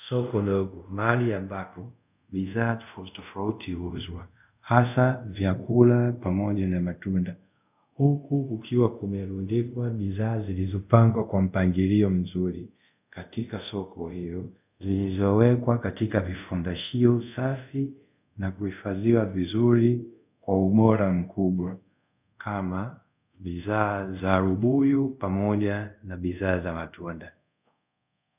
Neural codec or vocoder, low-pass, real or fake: codec, 24 kHz, 0.5 kbps, DualCodec; 3.6 kHz; fake